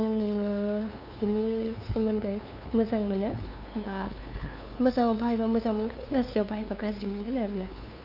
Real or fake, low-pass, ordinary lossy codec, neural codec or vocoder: fake; 5.4 kHz; AAC, 48 kbps; codec, 16 kHz, 2 kbps, FunCodec, trained on LibriTTS, 25 frames a second